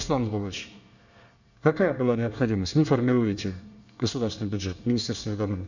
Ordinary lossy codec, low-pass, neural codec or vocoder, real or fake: none; 7.2 kHz; codec, 24 kHz, 1 kbps, SNAC; fake